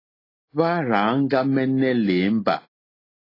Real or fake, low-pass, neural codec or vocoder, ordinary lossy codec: real; 5.4 kHz; none; AAC, 24 kbps